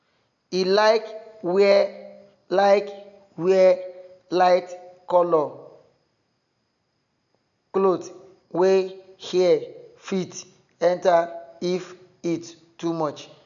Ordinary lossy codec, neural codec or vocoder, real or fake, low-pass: none; none; real; 7.2 kHz